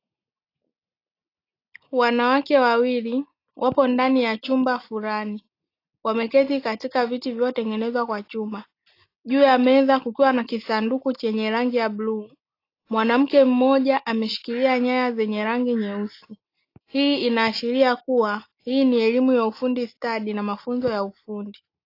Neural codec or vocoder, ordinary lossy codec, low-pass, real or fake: none; AAC, 32 kbps; 5.4 kHz; real